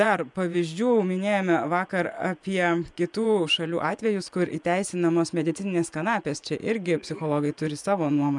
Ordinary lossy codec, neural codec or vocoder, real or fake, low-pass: MP3, 96 kbps; vocoder, 24 kHz, 100 mel bands, Vocos; fake; 10.8 kHz